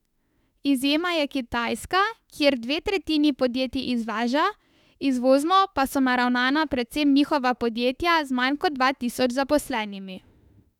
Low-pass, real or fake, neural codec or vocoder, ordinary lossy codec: 19.8 kHz; fake; autoencoder, 48 kHz, 32 numbers a frame, DAC-VAE, trained on Japanese speech; none